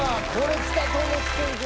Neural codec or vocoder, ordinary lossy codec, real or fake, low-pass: none; none; real; none